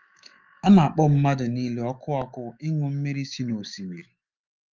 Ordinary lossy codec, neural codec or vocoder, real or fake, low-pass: Opus, 24 kbps; autoencoder, 48 kHz, 128 numbers a frame, DAC-VAE, trained on Japanese speech; fake; 7.2 kHz